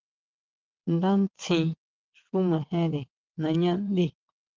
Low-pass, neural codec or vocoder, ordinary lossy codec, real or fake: 7.2 kHz; vocoder, 22.05 kHz, 80 mel bands, WaveNeXt; Opus, 24 kbps; fake